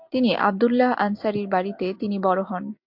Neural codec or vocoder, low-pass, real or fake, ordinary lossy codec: none; 5.4 kHz; real; MP3, 48 kbps